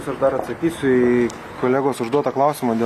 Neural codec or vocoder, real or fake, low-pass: none; real; 14.4 kHz